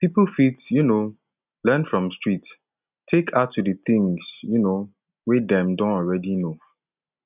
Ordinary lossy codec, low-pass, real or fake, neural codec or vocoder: none; 3.6 kHz; real; none